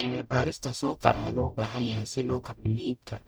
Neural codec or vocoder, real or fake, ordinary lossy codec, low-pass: codec, 44.1 kHz, 0.9 kbps, DAC; fake; none; none